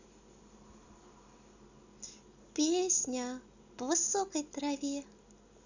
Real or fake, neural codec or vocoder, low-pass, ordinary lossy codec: real; none; 7.2 kHz; none